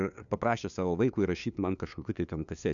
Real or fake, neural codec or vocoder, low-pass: fake; codec, 16 kHz, 2 kbps, FunCodec, trained on LibriTTS, 25 frames a second; 7.2 kHz